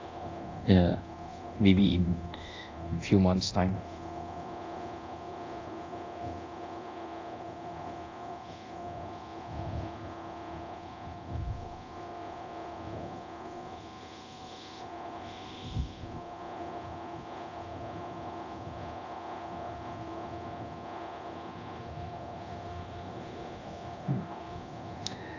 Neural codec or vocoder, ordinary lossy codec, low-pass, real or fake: codec, 24 kHz, 0.9 kbps, DualCodec; AAC, 48 kbps; 7.2 kHz; fake